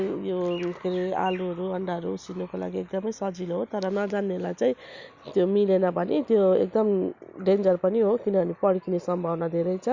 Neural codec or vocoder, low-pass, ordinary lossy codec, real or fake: none; 7.2 kHz; Opus, 64 kbps; real